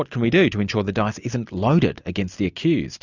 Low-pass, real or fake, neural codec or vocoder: 7.2 kHz; real; none